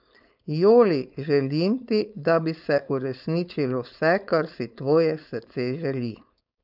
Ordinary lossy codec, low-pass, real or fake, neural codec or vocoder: none; 5.4 kHz; fake; codec, 16 kHz, 4.8 kbps, FACodec